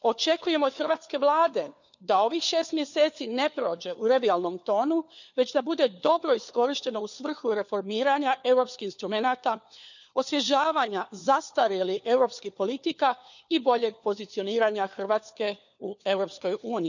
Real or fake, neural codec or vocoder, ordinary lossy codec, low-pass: fake; codec, 16 kHz, 4 kbps, FunCodec, trained on LibriTTS, 50 frames a second; none; 7.2 kHz